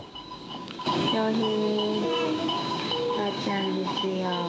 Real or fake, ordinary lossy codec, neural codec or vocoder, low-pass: fake; none; codec, 16 kHz, 6 kbps, DAC; none